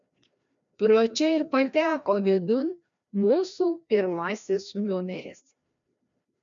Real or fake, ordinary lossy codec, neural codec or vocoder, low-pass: fake; MP3, 64 kbps; codec, 16 kHz, 1 kbps, FreqCodec, larger model; 7.2 kHz